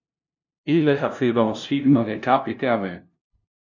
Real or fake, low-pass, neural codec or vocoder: fake; 7.2 kHz; codec, 16 kHz, 0.5 kbps, FunCodec, trained on LibriTTS, 25 frames a second